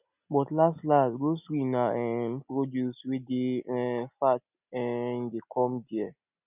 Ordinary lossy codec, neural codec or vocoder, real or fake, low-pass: none; none; real; 3.6 kHz